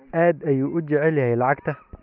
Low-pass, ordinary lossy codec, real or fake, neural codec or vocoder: 5.4 kHz; none; real; none